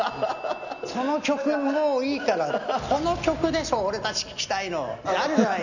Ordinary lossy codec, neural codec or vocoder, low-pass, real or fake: none; none; 7.2 kHz; real